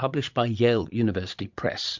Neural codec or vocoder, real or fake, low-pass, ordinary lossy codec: vocoder, 22.05 kHz, 80 mel bands, Vocos; fake; 7.2 kHz; MP3, 64 kbps